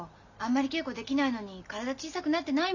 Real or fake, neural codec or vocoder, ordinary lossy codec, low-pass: real; none; none; 7.2 kHz